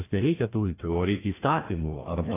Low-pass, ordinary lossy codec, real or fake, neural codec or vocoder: 3.6 kHz; AAC, 16 kbps; fake; codec, 16 kHz, 1 kbps, FreqCodec, larger model